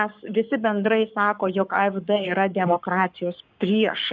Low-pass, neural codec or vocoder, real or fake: 7.2 kHz; codec, 44.1 kHz, 7.8 kbps, Pupu-Codec; fake